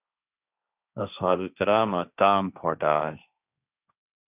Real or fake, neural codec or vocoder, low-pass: fake; codec, 16 kHz, 1.1 kbps, Voila-Tokenizer; 3.6 kHz